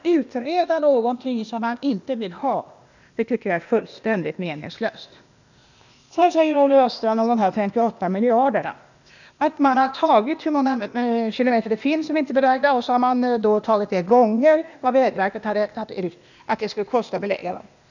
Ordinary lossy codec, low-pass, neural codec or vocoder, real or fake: none; 7.2 kHz; codec, 16 kHz, 0.8 kbps, ZipCodec; fake